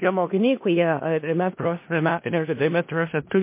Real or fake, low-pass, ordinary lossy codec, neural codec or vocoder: fake; 3.6 kHz; MP3, 24 kbps; codec, 16 kHz in and 24 kHz out, 0.4 kbps, LongCat-Audio-Codec, four codebook decoder